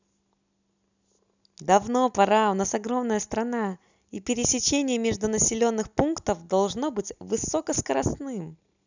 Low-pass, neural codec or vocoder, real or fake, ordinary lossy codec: 7.2 kHz; none; real; none